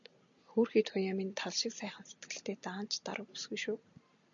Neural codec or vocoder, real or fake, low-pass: none; real; 7.2 kHz